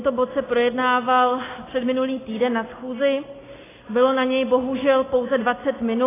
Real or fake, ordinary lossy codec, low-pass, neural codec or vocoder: real; AAC, 16 kbps; 3.6 kHz; none